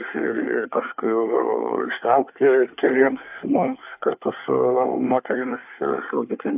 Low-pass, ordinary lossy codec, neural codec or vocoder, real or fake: 3.6 kHz; AAC, 32 kbps; codec, 24 kHz, 1 kbps, SNAC; fake